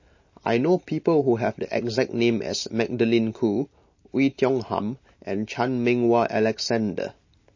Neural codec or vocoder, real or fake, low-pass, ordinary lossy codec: none; real; 7.2 kHz; MP3, 32 kbps